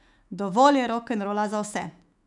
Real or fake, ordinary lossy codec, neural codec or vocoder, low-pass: fake; none; autoencoder, 48 kHz, 128 numbers a frame, DAC-VAE, trained on Japanese speech; 10.8 kHz